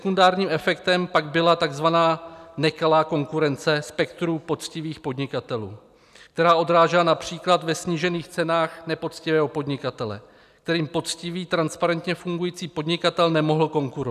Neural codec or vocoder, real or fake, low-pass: none; real; 14.4 kHz